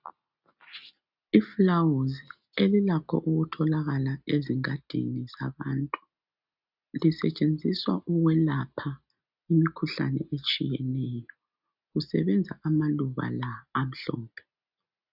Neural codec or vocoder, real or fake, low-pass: none; real; 5.4 kHz